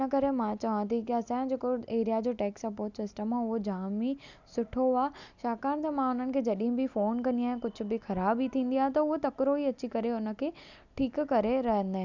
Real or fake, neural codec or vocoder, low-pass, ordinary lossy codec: real; none; 7.2 kHz; none